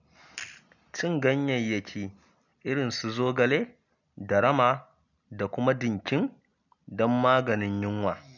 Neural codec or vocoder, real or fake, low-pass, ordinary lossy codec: none; real; 7.2 kHz; none